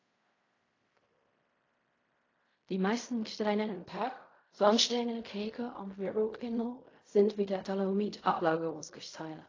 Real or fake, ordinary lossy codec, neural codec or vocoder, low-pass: fake; AAC, 32 kbps; codec, 16 kHz in and 24 kHz out, 0.4 kbps, LongCat-Audio-Codec, fine tuned four codebook decoder; 7.2 kHz